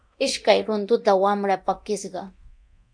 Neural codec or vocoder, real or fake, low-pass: codec, 24 kHz, 0.9 kbps, DualCodec; fake; 9.9 kHz